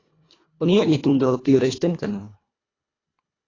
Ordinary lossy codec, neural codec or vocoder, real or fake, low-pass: AAC, 32 kbps; codec, 24 kHz, 1.5 kbps, HILCodec; fake; 7.2 kHz